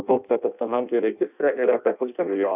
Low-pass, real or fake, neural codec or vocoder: 3.6 kHz; fake; codec, 16 kHz in and 24 kHz out, 0.6 kbps, FireRedTTS-2 codec